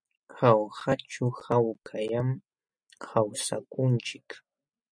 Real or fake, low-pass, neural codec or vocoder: real; 9.9 kHz; none